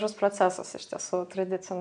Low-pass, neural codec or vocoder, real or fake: 9.9 kHz; none; real